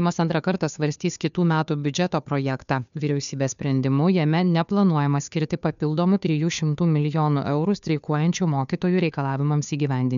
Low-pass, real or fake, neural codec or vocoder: 7.2 kHz; fake; codec, 16 kHz, 2 kbps, FunCodec, trained on Chinese and English, 25 frames a second